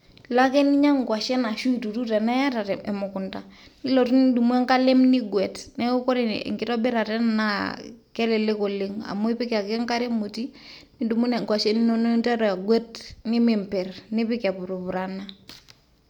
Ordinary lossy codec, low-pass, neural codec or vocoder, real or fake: none; 19.8 kHz; none; real